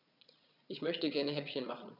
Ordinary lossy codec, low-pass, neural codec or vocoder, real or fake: none; 5.4 kHz; vocoder, 22.05 kHz, 80 mel bands, Vocos; fake